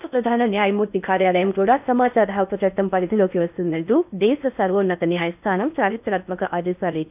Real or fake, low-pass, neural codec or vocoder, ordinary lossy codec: fake; 3.6 kHz; codec, 16 kHz in and 24 kHz out, 0.6 kbps, FocalCodec, streaming, 4096 codes; none